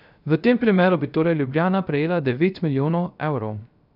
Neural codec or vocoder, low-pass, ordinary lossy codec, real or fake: codec, 16 kHz, 0.3 kbps, FocalCodec; 5.4 kHz; none; fake